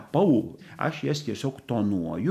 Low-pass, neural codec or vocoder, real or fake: 14.4 kHz; none; real